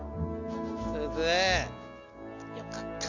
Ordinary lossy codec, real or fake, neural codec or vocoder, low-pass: MP3, 48 kbps; real; none; 7.2 kHz